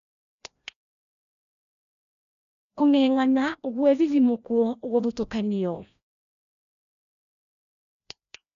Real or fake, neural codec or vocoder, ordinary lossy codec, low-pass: fake; codec, 16 kHz, 1 kbps, FreqCodec, larger model; none; 7.2 kHz